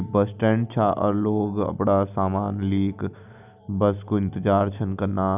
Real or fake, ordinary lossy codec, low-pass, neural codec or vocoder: real; none; 3.6 kHz; none